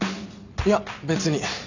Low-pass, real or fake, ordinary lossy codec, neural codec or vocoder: 7.2 kHz; real; none; none